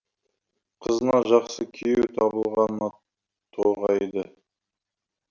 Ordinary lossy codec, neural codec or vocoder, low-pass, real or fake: none; none; 7.2 kHz; real